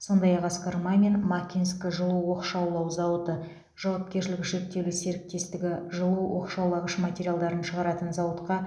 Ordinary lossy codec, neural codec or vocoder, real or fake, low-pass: none; none; real; none